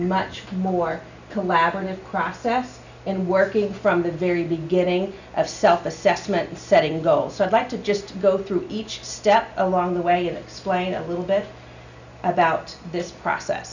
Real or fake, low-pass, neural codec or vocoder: real; 7.2 kHz; none